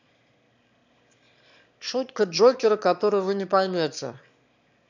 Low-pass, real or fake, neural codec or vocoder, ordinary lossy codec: 7.2 kHz; fake; autoencoder, 22.05 kHz, a latent of 192 numbers a frame, VITS, trained on one speaker; none